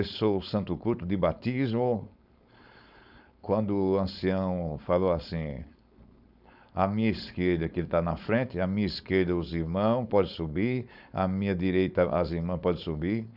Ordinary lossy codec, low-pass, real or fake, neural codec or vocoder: none; 5.4 kHz; fake; codec, 16 kHz, 4.8 kbps, FACodec